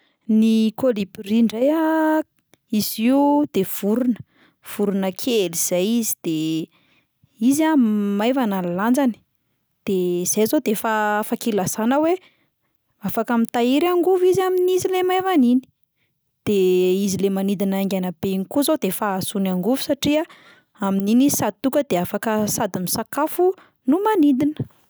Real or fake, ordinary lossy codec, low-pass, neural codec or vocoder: real; none; none; none